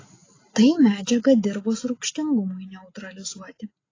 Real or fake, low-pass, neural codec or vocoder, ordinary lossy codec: real; 7.2 kHz; none; AAC, 32 kbps